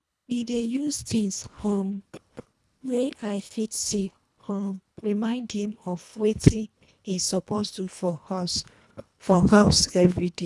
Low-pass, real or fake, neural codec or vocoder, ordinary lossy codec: none; fake; codec, 24 kHz, 1.5 kbps, HILCodec; none